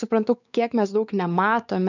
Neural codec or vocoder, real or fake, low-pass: codec, 16 kHz, 4 kbps, X-Codec, WavLM features, trained on Multilingual LibriSpeech; fake; 7.2 kHz